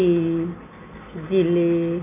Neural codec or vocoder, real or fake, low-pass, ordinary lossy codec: none; real; 3.6 kHz; MP3, 32 kbps